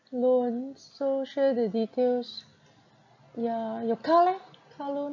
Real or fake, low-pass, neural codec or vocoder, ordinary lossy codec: real; 7.2 kHz; none; none